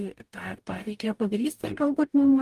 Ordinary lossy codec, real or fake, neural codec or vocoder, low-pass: Opus, 32 kbps; fake; codec, 44.1 kHz, 0.9 kbps, DAC; 14.4 kHz